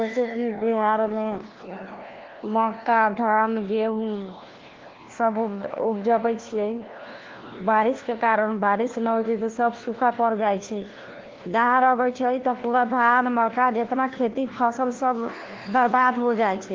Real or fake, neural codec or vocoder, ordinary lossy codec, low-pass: fake; codec, 16 kHz, 1 kbps, FunCodec, trained on LibriTTS, 50 frames a second; Opus, 16 kbps; 7.2 kHz